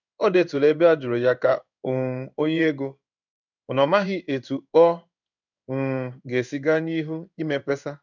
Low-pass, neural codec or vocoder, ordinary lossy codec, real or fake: 7.2 kHz; codec, 16 kHz in and 24 kHz out, 1 kbps, XY-Tokenizer; none; fake